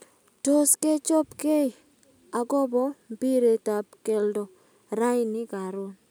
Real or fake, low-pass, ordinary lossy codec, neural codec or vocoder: real; none; none; none